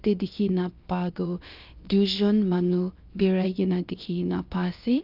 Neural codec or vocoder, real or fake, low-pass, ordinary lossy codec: codec, 16 kHz, about 1 kbps, DyCAST, with the encoder's durations; fake; 5.4 kHz; Opus, 24 kbps